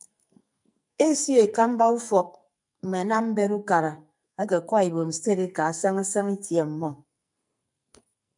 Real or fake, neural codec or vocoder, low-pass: fake; codec, 44.1 kHz, 2.6 kbps, SNAC; 10.8 kHz